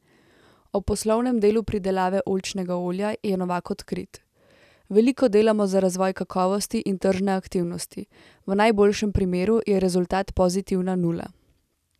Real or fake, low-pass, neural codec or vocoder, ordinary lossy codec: real; 14.4 kHz; none; none